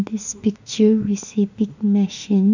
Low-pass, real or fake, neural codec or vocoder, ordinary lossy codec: 7.2 kHz; fake; autoencoder, 48 kHz, 32 numbers a frame, DAC-VAE, trained on Japanese speech; none